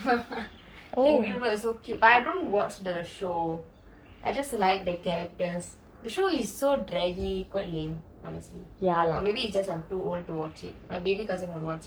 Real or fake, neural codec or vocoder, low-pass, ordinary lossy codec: fake; codec, 44.1 kHz, 3.4 kbps, Pupu-Codec; none; none